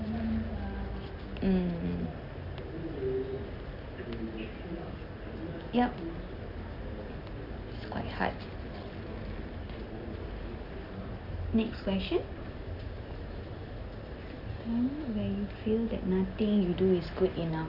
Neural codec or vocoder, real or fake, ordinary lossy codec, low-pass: none; real; Opus, 64 kbps; 5.4 kHz